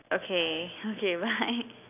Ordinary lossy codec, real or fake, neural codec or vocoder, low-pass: none; real; none; 3.6 kHz